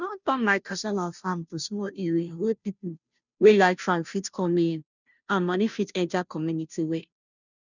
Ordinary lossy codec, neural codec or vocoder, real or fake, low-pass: none; codec, 16 kHz, 0.5 kbps, FunCodec, trained on Chinese and English, 25 frames a second; fake; 7.2 kHz